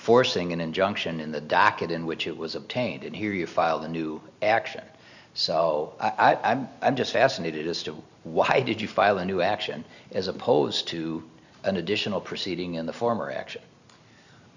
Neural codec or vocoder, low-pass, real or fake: none; 7.2 kHz; real